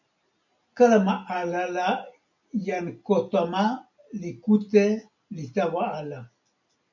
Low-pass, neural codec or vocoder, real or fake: 7.2 kHz; none; real